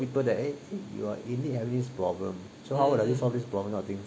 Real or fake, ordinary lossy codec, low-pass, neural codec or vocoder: real; none; none; none